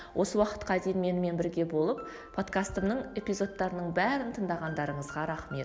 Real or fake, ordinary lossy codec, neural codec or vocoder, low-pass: real; none; none; none